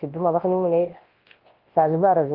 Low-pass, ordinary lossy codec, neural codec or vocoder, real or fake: 5.4 kHz; Opus, 16 kbps; codec, 24 kHz, 0.9 kbps, WavTokenizer, large speech release; fake